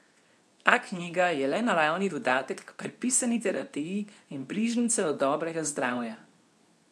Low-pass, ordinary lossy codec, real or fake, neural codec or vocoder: none; none; fake; codec, 24 kHz, 0.9 kbps, WavTokenizer, medium speech release version 2